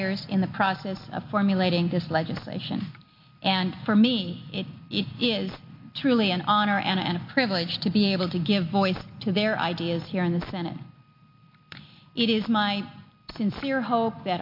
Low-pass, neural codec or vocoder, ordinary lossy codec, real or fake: 5.4 kHz; none; MP3, 32 kbps; real